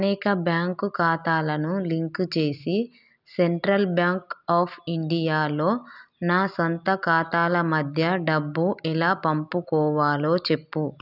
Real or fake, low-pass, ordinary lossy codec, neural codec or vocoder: real; 5.4 kHz; none; none